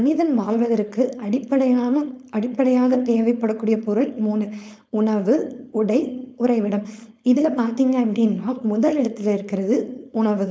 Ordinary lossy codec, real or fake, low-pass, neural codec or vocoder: none; fake; none; codec, 16 kHz, 4.8 kbps, FACodec